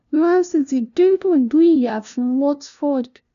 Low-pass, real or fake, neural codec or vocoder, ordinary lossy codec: 7.2 kHz; fake; codec, 16 kHz, 0.5 kbps, FunCodec, trained on LibriTTS, 25 frames a second; none